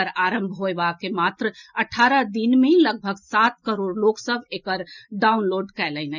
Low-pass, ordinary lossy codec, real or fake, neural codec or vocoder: 7.2 kHz; none; real; none